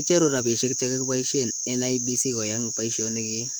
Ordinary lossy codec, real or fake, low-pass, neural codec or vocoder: none; fake; none; codec, 44.1 kHz, 7.8 kbps, DAC